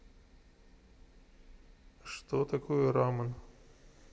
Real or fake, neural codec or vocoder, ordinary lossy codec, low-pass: real; none; none; none